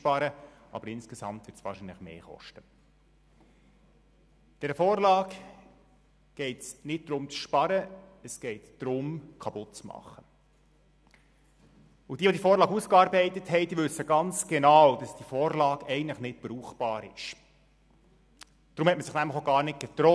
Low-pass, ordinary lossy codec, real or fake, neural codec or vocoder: none; none; real; none